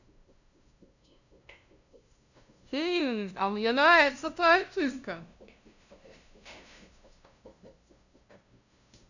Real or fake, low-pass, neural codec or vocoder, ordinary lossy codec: fake; 7.2 kHz; codec, 16 kHz, 0.5 kbps, FunCodec, trained on LibriTTS, 25 frames a second; none